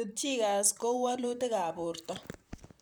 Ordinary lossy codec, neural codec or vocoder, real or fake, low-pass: none; vocoder, 44.1 kHz, 128 mel bands, Pupu-Vocoder; fake; none